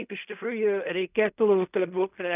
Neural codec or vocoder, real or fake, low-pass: codec, 16 kHz in and 24 kHz out, 0.4 kbps, LongCat-Audio-Codec, fine tuned four codebook decoder; fake; 3.6 kHz